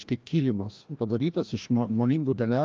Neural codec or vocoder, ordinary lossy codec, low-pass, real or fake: codec, 16 kHz, 1 kbps, FreqCodec, larger model; Opus, 24 kbps; 7.2 kHz; fake